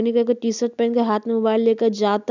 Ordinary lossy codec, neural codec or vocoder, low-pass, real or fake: none; none; 7.2 kHz; real